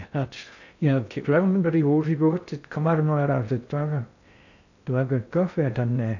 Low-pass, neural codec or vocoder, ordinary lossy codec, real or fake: 7.2 kHz; codec, 16 kHz in and 24 kHz out, 0.6 kbps, FocalCodec, streaming, 2048 codes; none; fake